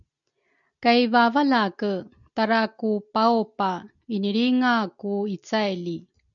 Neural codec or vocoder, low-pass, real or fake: none; 7.2 kHz; real